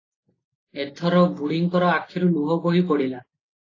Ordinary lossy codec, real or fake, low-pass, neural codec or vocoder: AAC, 32 kbps; real; 7.2 kHz; none